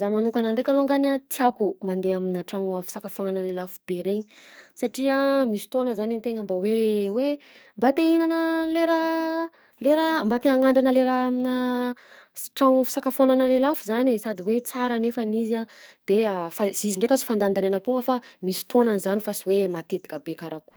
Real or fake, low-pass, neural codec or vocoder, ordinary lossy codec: fake; none; codec, 44.1 kHz, 2.6 kbps, SNAC; none